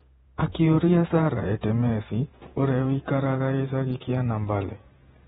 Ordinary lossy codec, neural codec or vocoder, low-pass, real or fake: AAC, 16 kbps; vocoder, 48 kHz, 128 mel bands, Vocos; 19.8 kHz; fake